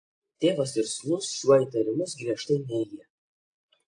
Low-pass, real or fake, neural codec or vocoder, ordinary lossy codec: 9.9 kHz; real; none; AAC, 48 kbps